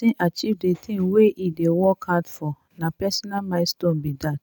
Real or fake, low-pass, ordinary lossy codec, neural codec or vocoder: real; none; none; none